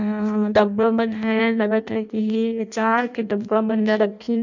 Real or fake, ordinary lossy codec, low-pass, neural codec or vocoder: fake; none; 7.2 kHz; codec, 16 kHz in and 24 kHz out, 0.6 kbps, FireRedTTS-2 codec